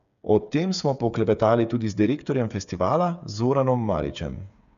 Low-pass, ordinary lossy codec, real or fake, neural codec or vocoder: 7.2 kHz; none; fake; codec, 16 kHz, 8 kbps, FreqCodec, smaller model